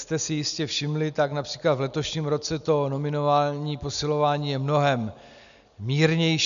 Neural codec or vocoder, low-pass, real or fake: none; 7.2 kHz; real